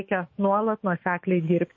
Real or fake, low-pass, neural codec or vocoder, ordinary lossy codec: fake; 7.2 kHz; autoencoder, 48 kHz, 128 numbers a frame, DAC-VAE, trained on Japanese speech; MP3, 32 kbps